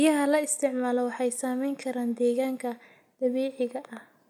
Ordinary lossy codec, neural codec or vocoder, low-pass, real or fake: none; none; 19.8 kHz; real